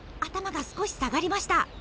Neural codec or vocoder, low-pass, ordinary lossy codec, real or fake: none; none; none; real